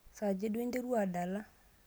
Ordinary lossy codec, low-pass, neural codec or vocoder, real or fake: none; none; none; real